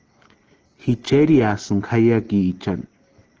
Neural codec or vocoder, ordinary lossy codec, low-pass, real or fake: none; Opus, 16 kbps; 7.2 kHz; real